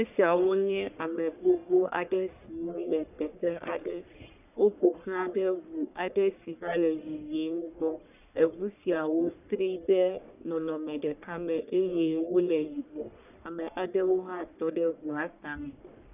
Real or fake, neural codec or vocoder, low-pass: fake; codec, 44.1 kHz, 1.7 kbps, Pupu-Codec; 3.6 kHz